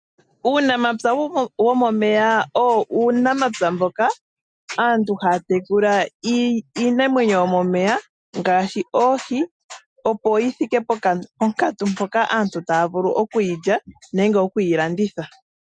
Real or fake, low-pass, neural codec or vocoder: real; 9.9 kHz; none